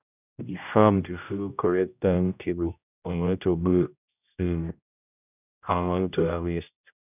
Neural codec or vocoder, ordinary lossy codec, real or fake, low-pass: codec, 16 kHz, 0.5 kbps, X-Codec, HuBERT features, trained on general audio; none; fake; 3.6 kHz